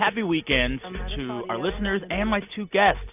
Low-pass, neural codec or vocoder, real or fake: 3.6 kHz; none; real